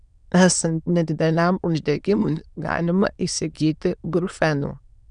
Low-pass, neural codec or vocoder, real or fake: 9.9 kHz; autoencoder, 22.05 kHz, a latent of 192 numbers a frame, VITS, trained on many speakers; fake